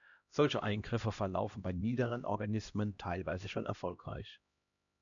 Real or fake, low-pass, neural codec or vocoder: fake; 7.2 kHz; codec, 16 kHz, 1 kbps, X-Codec, HuBERT features, trained on LibriSpeech